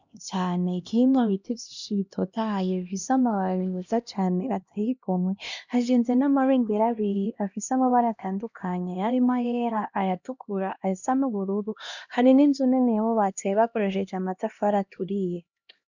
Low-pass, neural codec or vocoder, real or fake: 7.2 kHz; codec, 16 kHz, 1 kbps, X-Codec, HuBERT features, trained on LibriSpeech; fake